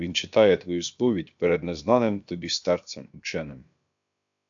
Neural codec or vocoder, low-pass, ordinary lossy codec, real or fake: codec, 16 kHz, about 1 kbps, DyCAST, with the encoder's durations; 7.2 kHz; AAC, 64 kbps; fake